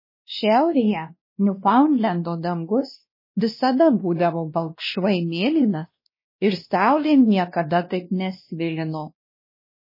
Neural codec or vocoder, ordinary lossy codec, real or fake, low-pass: codec, 16 kHz, 1 kbps, X-Codec, WavLM features, trained on Multilingual LibriSpeech; MP3, 24 kbps; fake; 5.4 kHz